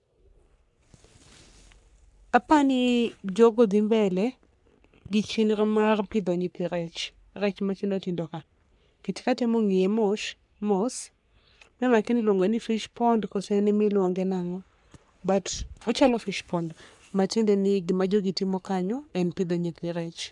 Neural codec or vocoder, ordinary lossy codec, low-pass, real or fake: codec, 44.1 kHz, 3.4 kbps, Pupu-Codec; none; 10.8 kHz; fake